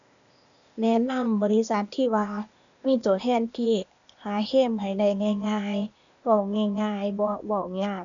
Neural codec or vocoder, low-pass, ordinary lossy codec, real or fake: codec, 16 kHz, 0.8 kbps, ZipCodec; 7.2 kHz; MP3, 96 kbps; fake